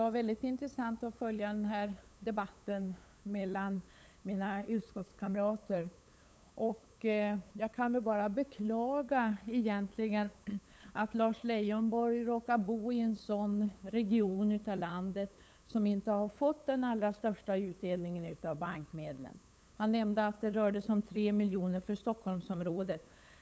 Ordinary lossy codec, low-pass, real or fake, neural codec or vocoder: none; none; fake; codec, 16 kHz, 8 kbps, FunCodec, trained on LibriTTS, 25 frames a second